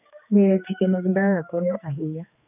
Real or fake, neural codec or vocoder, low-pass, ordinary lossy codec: fake; codec, 16 kHz, 2 kbps, X-Codec, HuBERT features, trained on general audio; 3.6 kHz; none